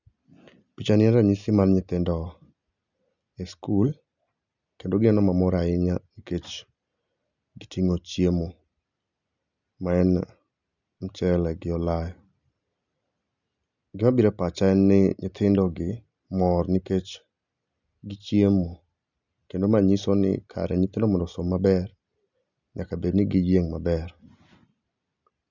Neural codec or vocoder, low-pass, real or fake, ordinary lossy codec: none; 7.2 kHz; real; none